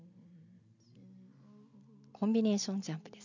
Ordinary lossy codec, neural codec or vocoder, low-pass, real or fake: none; none; 7.2 kHz; real